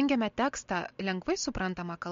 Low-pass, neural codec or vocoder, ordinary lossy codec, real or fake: 7.2 kHz; none; MP3, 48 kbps; real